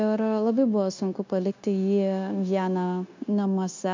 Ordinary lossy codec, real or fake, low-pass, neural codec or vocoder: MP3, 64 kbps; fake; 7.2 kHz; codec, 16 kHz, 0.9 kbps, LongCat-Audio-Codec